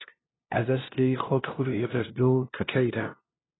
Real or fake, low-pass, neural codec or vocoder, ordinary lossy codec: fake; 7.2 kHz; codec, 16 kHz, 0.5 kbps, FunCodec, trained on LibriTTS, 25 frames a second; AAC, 16 kbps